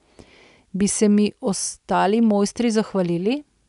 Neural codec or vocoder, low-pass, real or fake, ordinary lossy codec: none; 10.8 kHz; real; none